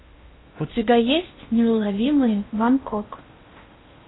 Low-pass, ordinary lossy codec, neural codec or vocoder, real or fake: 7.2 kHz; AAC, 16 kbps; codec, 16 kHz in and 24 kHz out, 0.8 kbps, FocalCodec, streaming, 65536 codes; fake